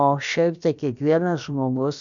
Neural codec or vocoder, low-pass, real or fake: codec, 16 kHz, about 1 kbps, DyCAST, with the encoder's durations; 7.2 kHz; fake